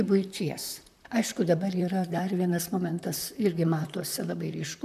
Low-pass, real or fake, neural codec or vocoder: 14.4 kHz; fake; vocoder, 44.1 kHz, 128 mel bands, Pupu-Vocoder